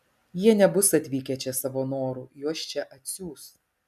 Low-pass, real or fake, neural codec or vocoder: 14.4 kHz; real; none